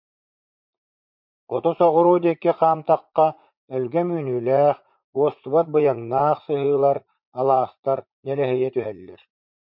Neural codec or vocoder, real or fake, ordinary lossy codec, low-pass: vocoder, 44.1 kHz, 80 mel bands, Vocos; fake; MP3, 48 kbps; 5.4 kHz